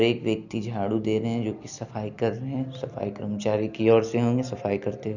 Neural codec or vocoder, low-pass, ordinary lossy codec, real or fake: none; 7.2 kHz; none; real